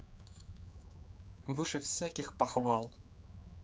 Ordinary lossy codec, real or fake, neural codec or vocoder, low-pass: none; fake; codec, 16 kHz, 4 kbps, X-Codec, HuBERT features, trained on general audio; none